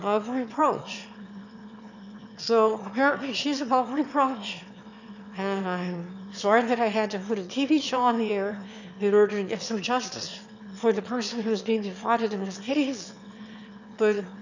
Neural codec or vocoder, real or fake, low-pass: autoencoder, 22.05 kHz, a latent of 192 numbers a frame, VITS, trained on one speaker; fake; 7.2 kHz